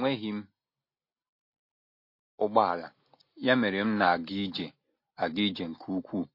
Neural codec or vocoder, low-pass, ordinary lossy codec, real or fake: none; 5.4 kHz; MP3, 32 kbps; real